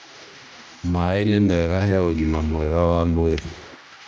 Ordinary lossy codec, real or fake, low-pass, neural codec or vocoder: none; fake; none; codec, 16 kHz, 1 kbps, X-Codec, HuBERT features, trained on general audio